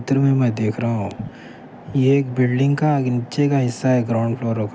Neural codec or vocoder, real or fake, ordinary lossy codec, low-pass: none; real; none; none